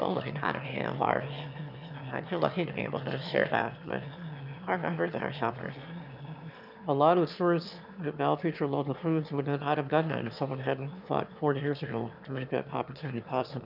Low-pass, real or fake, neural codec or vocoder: 5.4 kHz; fake; autoencoder, 22.05 kHz, a latent of 192 numbers a frame, VITS, trained on one speaker